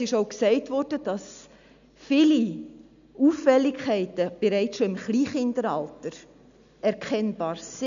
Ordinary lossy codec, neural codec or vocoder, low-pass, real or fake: AAC, 96 kbps; none; 7.2 kHz; real